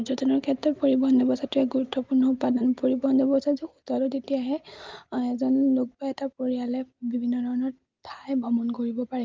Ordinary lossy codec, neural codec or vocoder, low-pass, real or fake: Opus, 32 kbps; none; 7.2 kHz; real